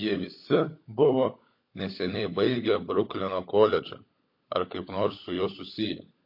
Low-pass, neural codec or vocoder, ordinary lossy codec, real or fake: 5.4 kHz; codec, 16 kHz, 16 kbps, FunCodec, trained on LibriTTS, 50 frames a second; MP3, 32 kbps; fake